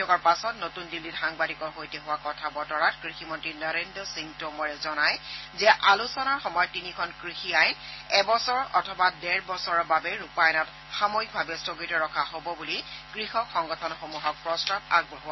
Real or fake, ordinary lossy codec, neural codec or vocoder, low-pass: real; MP3, 24 kbps; none; 7.2 kHz